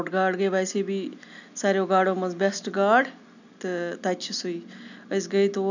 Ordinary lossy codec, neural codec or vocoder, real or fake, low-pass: none; none; real; 7.2 kHz